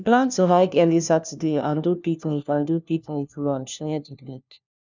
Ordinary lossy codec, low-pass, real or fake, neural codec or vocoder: none; 7.2 kHz; fake; codec, 16 kHz, 1 kbps, FunCodec, trained on LibriTTS, 50 frames a second